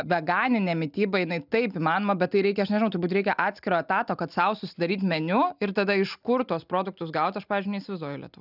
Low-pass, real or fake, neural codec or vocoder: 5.4 kHz; real; none